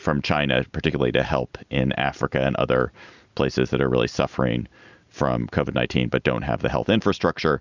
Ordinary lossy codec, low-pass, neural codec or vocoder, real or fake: Opus, 64 kbps; 7.2 kHz; none; real